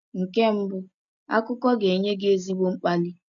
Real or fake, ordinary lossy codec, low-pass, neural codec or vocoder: real; none; 7.2 kHz; none